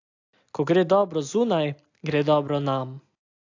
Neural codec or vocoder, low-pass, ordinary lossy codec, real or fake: none; 7.2 kHz; AAC, 48 kbps; real